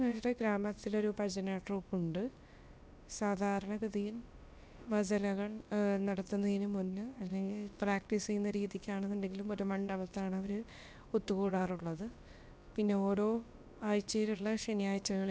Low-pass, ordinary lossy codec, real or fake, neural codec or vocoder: none; none; fake; codec, 16 kHz, about 1 kbps, DyCAST, with the encoder's durations